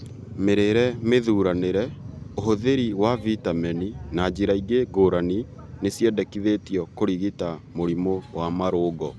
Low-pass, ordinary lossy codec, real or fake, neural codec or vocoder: none; none; real; none